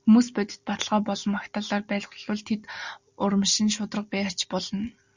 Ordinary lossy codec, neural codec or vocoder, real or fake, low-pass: Opus, 64 kbps; none; real; 7.2 kHz